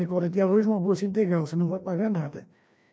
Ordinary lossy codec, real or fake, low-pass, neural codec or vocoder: none; fake; none; codec, 16 kHz, 1 kbps, FreqCodec, larger model